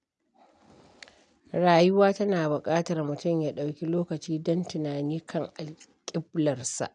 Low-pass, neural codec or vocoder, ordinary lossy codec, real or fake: 9.9 kHz; none; none; real